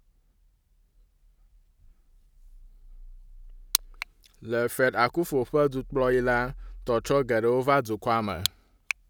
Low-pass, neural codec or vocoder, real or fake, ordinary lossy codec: none; none; real; none